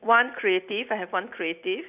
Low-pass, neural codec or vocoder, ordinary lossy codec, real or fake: 3.6 kHz; none; none; real